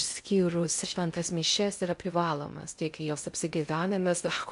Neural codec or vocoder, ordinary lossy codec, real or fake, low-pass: codec, 16 kHz in and 24 kHz out, 0.6 kbps, FocalCodec, streaming, 2048 codes; MP3, 64 kbps; fake; 10.8 kHz